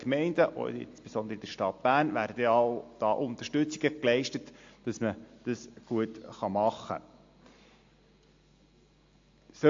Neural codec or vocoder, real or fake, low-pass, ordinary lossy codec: none; real; 7.2 kHz; AAC, 48 kbps